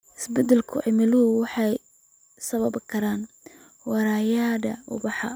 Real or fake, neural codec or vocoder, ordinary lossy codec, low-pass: real; none; none; none